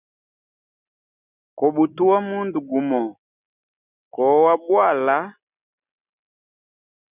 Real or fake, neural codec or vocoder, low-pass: real; none; 3.6 kHz